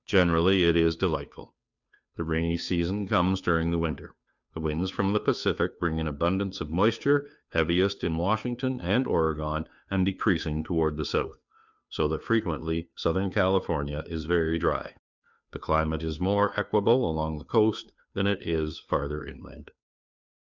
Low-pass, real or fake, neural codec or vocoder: 7.2 kHz; fake; codec, 16 kHz, 2 kbps, FunCodec, trained on Chinese and English, 25 frames a second